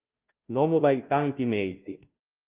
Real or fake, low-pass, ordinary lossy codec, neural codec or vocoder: fake; 3.6 kHz; Opus, 32 kbps; codec, 16 kHz, 0.5 kbps, FunCodec, trained on Chinese and English, 25 frames a second